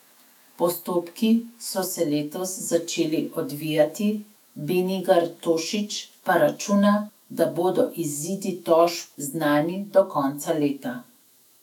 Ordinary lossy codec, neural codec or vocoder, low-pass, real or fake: none; autoencoder, 48 kHz, 128 numbers a frame, DAC-VAE, trained on Japanese speech; 19.8 kHz; fake